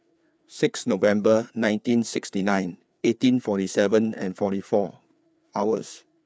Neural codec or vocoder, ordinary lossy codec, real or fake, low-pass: codec, 16 kHz, 4 kbps, FreqCodec, larger model; none; fake; none